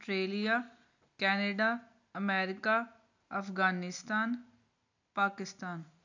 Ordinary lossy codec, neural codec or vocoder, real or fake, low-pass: none; none; real; 7.2 kHz